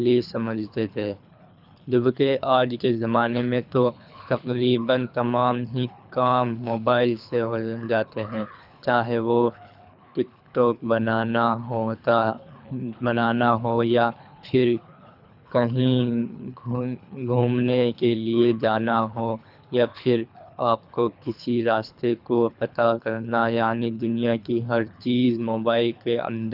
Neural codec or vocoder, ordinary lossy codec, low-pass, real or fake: codec, 24 kHz, 3 kbps, HILCodec; none; 5.4 kHz; fake